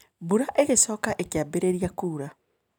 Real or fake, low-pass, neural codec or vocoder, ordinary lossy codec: real; none; none; none